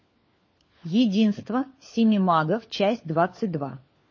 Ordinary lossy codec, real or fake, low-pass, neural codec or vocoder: MP3, 32 kbps; fake; 7.2 kHz; codec, 16 kHz, 4 kbps, FunCodec, trained on LibriTTS, 50 frames a second